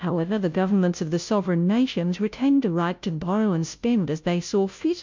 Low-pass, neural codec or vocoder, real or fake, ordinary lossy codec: 7.2 kHz; codec, 16 kHz, 0.5 kbps, FunCodec, trained on Chinese and English, 25 frames a second; fake; MP3, 64 kbps